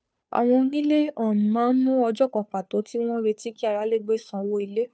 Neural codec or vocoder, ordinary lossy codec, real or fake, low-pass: codec, 16 kHz, 2 kbps, FunCodec, trained on Chinese and English, 25 frames a second; none; fake; none